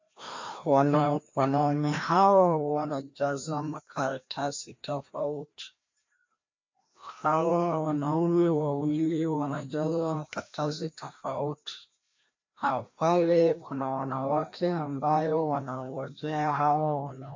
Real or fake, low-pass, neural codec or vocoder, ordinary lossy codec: fake; 7.2 kHz; codec, 16 kHz, 1 kbps, FreqCodec, larger model; MP3, 48 kbps